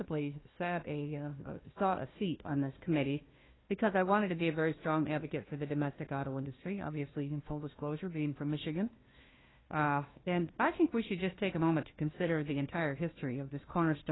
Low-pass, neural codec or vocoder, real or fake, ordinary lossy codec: 7.2 kHz; codec, 16 kHz, 1 kbps, FunCodec, trained on Chinese and English, 50 frames a second; fake; AAC, 16 kbps